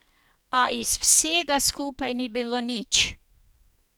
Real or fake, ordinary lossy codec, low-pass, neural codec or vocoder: fake; none; none; codec, 44.1 kHz, 2.6 kbps, SNAC